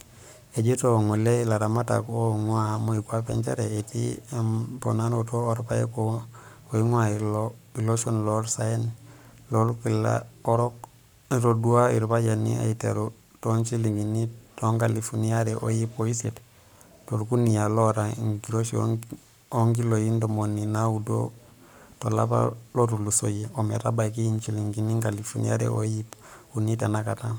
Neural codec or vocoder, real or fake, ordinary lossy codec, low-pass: codec, 44.1 kHz, 7.8 kbps, Pupu-Codec; fake; none; none